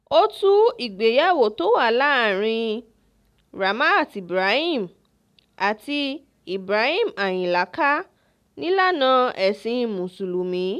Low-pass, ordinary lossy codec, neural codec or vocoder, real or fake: 14.4 kHz; none; none; real